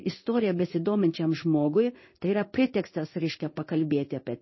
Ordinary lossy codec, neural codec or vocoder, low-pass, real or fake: MP3, 24 kbps; codec, 16 kHz in and 24 kHz out, 1 kbps, XY-Tokenizer; 7.2 kHz; fake